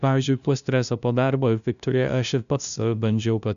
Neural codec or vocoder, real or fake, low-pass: codec, 16 kHz, 0.5 kbps, FunCodec, trained on LibriTTS, 25 frames a second; fake; 7.2 kHz